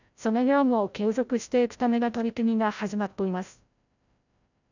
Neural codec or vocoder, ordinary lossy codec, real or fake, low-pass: codec, 16 kHz, 0.5 kbps, FreqCodec, larger model; none; fake; 7.2 kHz